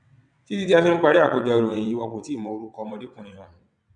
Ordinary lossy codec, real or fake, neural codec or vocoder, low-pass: none; fake; vocoder, 22.05 kHz, 80 mel bands, WaveNeXt; 9.9 kHz